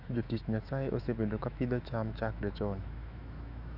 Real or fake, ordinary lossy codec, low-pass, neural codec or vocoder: fake; none; 5.4 kHz; autoencoder, 48 kHz, 128 numbers a frame, DAC-VAE, trained on Japanese speech